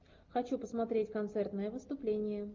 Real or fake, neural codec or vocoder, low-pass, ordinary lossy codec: real; none; 7.2 kHz; Opus, 24 kbps